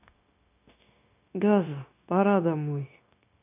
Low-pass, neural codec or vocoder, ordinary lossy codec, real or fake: 3.6 kHz; codec, 16 kHz, 0.9 kbps, LongCat-Audio-Codec; none; fake